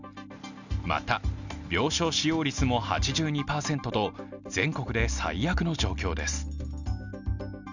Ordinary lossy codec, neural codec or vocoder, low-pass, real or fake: none; none; 7.2 kHz; real